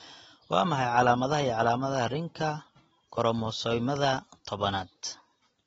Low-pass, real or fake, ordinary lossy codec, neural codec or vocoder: 19.8 kHz; real; AAC, 24 kbps; none